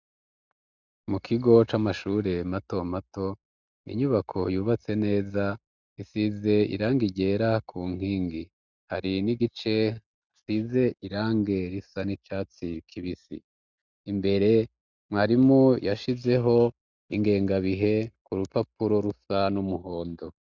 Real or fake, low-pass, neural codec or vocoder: real; 7.2 kHz; none